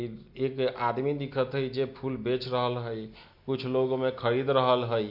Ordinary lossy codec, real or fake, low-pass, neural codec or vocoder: MP3, 48 kbps; real; 5.4 kHz; none